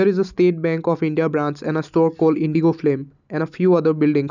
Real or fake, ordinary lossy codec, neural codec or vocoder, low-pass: real; none; none; 7.2 kHz